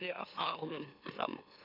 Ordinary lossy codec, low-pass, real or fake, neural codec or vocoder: none; 5.4 kHz; fake; autoencoder, 44.1 kHz, a latent of 192 numbers a frame, MeloTTS